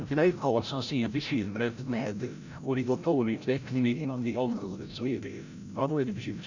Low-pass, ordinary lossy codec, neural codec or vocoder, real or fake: 7.2 kHz; none; codec, 16 kHz, 0.5 kbps, FreqCodec, larger model; fake